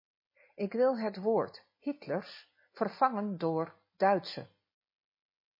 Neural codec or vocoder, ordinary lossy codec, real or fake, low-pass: none; MP3, 24 kbps; real; 5.4 kHz